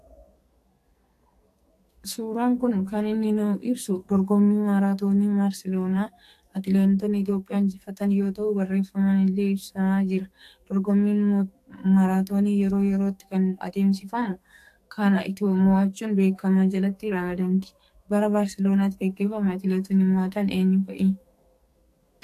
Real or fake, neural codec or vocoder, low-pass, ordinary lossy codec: fake; codec, 44.1 kHz, 2.6 kbps, SNAC; 14.4 kHz; AAC, 64 kbps